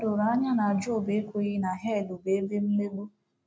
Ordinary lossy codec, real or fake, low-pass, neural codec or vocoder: none; real; none; none